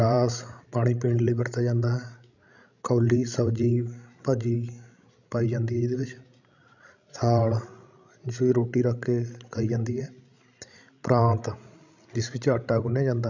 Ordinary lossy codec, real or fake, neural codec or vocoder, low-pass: none; fake; codec, 16 kHz, 16 kbps, FreqCodec, larger model; 7.2 kHz